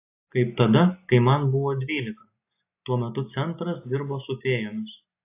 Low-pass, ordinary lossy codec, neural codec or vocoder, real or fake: 3.6 kHz; AAC, 24 kbps; none; real